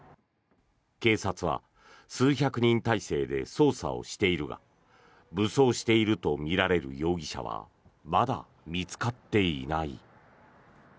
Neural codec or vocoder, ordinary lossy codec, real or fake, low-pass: none; none; real; none